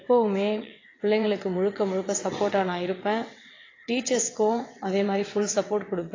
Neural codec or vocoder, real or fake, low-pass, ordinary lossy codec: vocoder, 22.05 kHz, 80 mel bands, WaveNeXt; fake; 7.2 kHz; AAC, 32 kbps